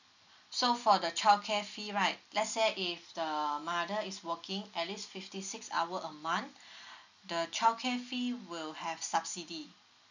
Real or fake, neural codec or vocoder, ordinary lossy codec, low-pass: real; none; none; 7.2 kHz